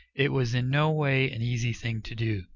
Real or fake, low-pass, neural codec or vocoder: real; 7.2 kHz; none